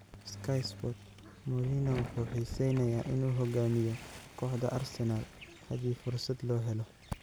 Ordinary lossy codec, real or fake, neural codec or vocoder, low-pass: none; real; none; none